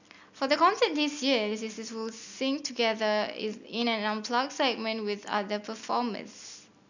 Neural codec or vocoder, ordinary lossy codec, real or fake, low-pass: none; none; real; 7.2 kHz